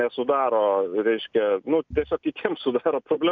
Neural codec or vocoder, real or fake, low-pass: none; real; 7.2 kHz